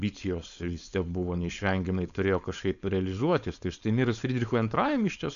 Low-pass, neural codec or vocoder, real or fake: 7.2 kHz; codec, 16 kHz, 4.8 kbps, FACodec; fake